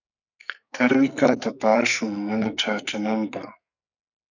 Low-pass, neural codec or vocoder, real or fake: 7.2 kHz; codec, 44.1 kHz, 2.6 kbps, SNAC; fake